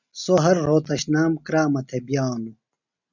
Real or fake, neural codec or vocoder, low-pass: real; none; 7.2 kHz